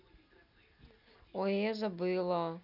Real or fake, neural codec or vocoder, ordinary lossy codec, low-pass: real; none; none; 5.4 kHz